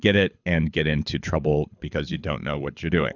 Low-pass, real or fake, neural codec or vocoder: 7.2 kHz; fake; codec, 24 kHz, 6 kbps, HILCodec